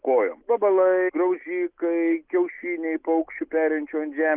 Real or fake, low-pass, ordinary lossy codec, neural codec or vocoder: real; 3.6 kHz; Opus, 32 kbps; none